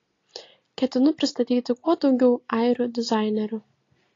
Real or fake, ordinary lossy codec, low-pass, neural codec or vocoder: real; AAC, 32 kbps; 7.2 kHz; none